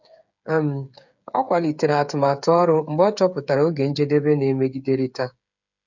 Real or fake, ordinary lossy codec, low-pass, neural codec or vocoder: fake; none; 7.2 kHz; codec, 16 kHz, 8 kbps, FreqCodec, smaller model